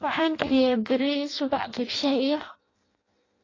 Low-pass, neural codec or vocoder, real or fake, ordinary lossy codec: 7.2 kHz; codec, 16 kHz in and 24 kHz out, 0.6 kbps, FireRedTTS-2 codec; fake; AAC, 32 kbps